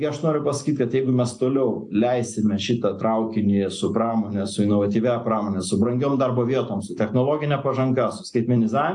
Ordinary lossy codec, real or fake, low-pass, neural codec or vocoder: AAC, 64 kbps; real; 10.8 kHz; none